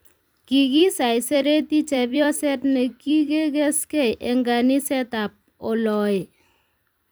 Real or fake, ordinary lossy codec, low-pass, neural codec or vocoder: fake; none; none; vocoder, 44.1 kHz, 128 mel bands every 256 samples, BigVGAN v2